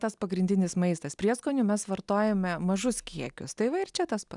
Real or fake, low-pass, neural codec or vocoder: real; 10.8 kHz; none